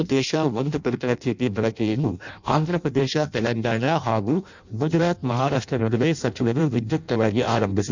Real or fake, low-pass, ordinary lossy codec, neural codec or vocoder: fake; 7.2 kHz; none; codec, 16 kHz in and 24 kHz out, 0.6 kbps, FireRedTTS-2 codec